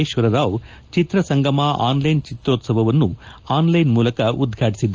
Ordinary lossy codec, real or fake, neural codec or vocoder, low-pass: Opus, 24 kbps; real; none; 7.2 kHz